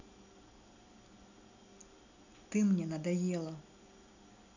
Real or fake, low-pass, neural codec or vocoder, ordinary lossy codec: real; 7.2 kHz; none; none